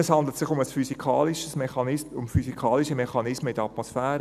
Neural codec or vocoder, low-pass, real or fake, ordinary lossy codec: none; 14.4 kHz; real; none